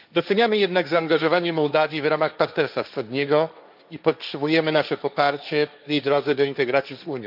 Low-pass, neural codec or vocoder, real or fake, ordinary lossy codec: 5.4 kHz; codec, 16 kHz, 1.1 kbps, Voila-Tokenizer; fake; none